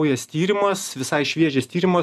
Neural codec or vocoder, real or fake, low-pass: none; real; 14.4 kHz